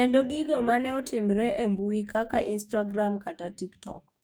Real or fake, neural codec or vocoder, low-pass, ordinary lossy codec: fake; codec, 44.1 kHz, 2.6 kbps, DAC; none; none